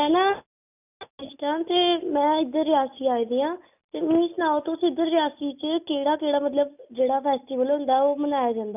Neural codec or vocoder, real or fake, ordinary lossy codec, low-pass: none; real; none; 3.6 kHz